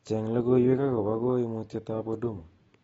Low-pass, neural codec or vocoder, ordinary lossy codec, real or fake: 14.4 kHz; none; AAC, 24 kbps; real